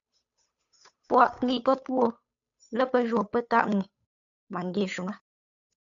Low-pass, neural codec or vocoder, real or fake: 7.2 kHz; codec, 16 kHz, 8 kbps, FunCodec, trained on Chinese and English, 25 frames a second; fake